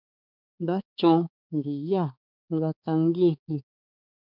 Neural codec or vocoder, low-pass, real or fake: codec, 16 kHz, 4 kbps, X-Codec, HuBERT features, trained on balanced general audio; 5.4 kHz; fake